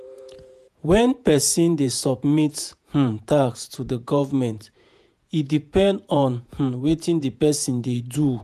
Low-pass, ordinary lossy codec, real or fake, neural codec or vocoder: 14.4 kHz; none; fake; vocoder, 48 kHz, 128 mel bands, Vocos